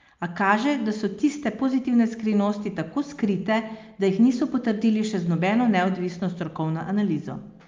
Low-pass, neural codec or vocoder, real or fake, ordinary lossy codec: 7.2 kHz; none; real; Opus, 24 kbps